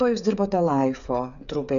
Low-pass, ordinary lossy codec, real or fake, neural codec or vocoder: 7.2 kHz; MP3, 96 kbps; fake; codec, 16 kHz, 8 kbps, FreqCodec, smaller model